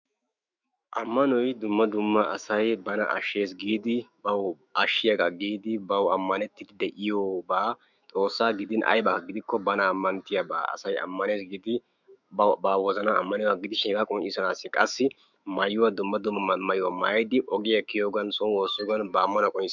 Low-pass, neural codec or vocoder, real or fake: 7.2 kHz; autoencoder, 48 kHz, 128 numbers a frame, DAC-VAE, trained on Japanese speech; fake